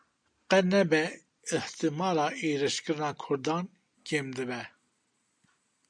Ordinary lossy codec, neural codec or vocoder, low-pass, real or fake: MP3, 64 kbps; none; 9.9 kHz; real